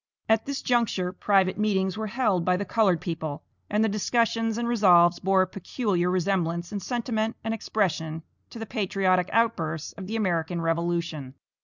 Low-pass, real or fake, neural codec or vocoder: 7.2 kHz; real; none